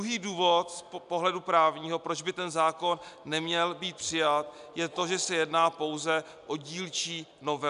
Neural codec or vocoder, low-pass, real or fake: none; 10.8 kHz; real